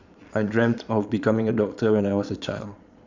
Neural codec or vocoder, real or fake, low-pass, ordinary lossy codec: vocoder, 22.05 kHz, 80 mel bands, Vocos; fake; 7.2 kHz; Opus, 64 kbps